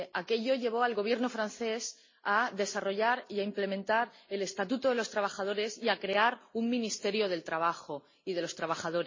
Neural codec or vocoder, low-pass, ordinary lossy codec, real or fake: none; 7.2 kHz; MP3, 32 kbps; real